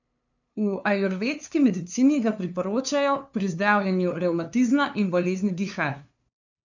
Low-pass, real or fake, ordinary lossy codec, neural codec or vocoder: 7.2 kHz; fake; none; codec, 16 kHz, 2 kbps, FunCodec, trained on LibriTTS, 25 frames a second